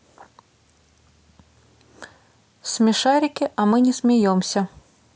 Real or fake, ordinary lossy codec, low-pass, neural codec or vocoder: real; none; none; none